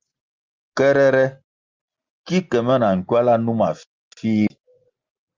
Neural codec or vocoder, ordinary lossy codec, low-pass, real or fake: none; Opus, 32 kbps; 7.2 kHz; real